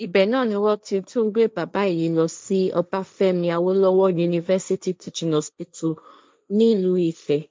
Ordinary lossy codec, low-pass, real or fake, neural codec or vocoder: none; none; fake; codec, 16 kHz, 1.1 kbps, Voila-Tokenizer